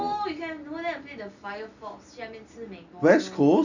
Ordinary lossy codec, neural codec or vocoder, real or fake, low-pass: none; none; real; 7.2 kHz